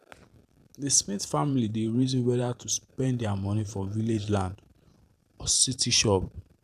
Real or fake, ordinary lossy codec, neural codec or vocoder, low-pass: fake; none; vocoder, 44.1 kHz, 128 mel bands every 512 samples, BigVGAN v2; 14.4 kHz